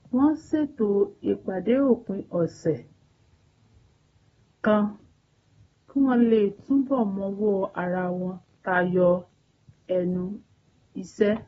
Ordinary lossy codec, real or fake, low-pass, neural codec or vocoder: AAC, 24 kbps; real; 10.8 kHz; none